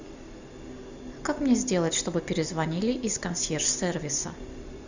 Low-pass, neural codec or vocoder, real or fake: 7.2 kHz; none; real